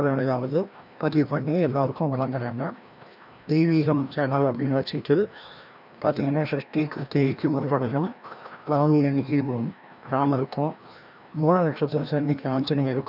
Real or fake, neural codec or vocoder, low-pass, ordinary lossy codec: fake; codec, 16 kHz, 1 kbps, FreqCodec, larger model; 5.4 kHz; none